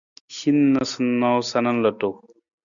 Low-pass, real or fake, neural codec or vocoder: 7.2 kHz; real; none